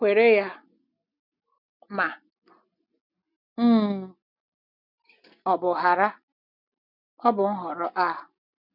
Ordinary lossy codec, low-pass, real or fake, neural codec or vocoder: none; 5.4 kHz; real; none